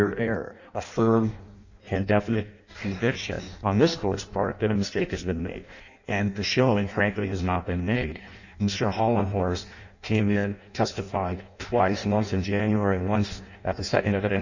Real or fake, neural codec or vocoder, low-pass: fake; codec, 16 kHz in and 24 kHz out, 0.6 kbps, FireRedTTS-2 codec; 7.2 kHz